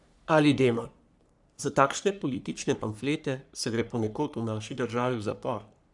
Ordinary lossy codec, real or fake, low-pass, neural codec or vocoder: none; fake; 10.8 kHz; codec, 44.1 kHz, 3.4 kbps, Pupu-Codec